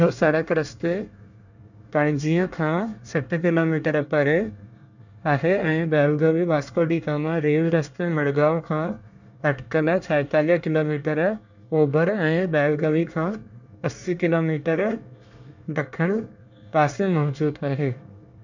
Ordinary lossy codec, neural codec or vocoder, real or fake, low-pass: none; codec, 24 kHz, 1 kbps, SNAC; fake; 7.2 kHz